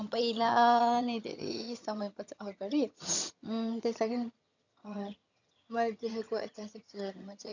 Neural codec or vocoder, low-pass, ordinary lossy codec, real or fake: vocoder, 22.05 kHz, 80 mel bands, HiFi-GAN; 7.2 kHz; none; fake